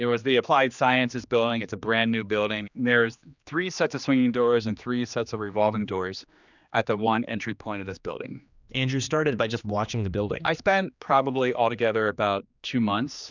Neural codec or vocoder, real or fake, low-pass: codec, 16 kHz, 2 kbps, X-Codec, HuBERT features, trained on general audio; fake; 7.2 kHz